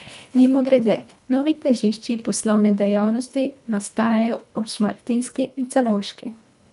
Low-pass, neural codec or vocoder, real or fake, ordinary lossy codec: 10.8 kHz; codec, 24 kHz, 1.5 kbps, HILCodec; fake; none